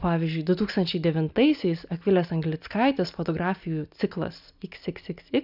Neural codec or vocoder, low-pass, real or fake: none; 5.4 kHz; real